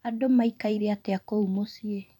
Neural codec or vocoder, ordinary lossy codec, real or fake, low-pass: vocoder, 44.1 kHz, 128 mel bands every 256 samples, BigVGAN v2; none; fake; 19.8 kHz